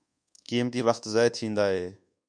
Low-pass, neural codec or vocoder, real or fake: 9.9 kHz; codec, 24 kHz, 1.2 kbps, DualCodec; fake